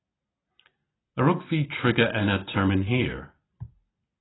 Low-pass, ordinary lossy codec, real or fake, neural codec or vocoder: 7.2 kHz; AAC, 16 kbps; real; none